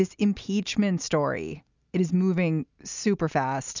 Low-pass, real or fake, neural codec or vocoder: 7.2 kHz; real; none